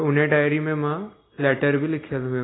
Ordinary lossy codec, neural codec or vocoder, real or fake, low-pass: AAC, 16 kbps; none; real; 7.2 kHz